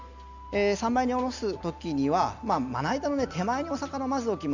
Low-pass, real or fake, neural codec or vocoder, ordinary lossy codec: 7.2 kHz; real; none; Opus, 64 kbps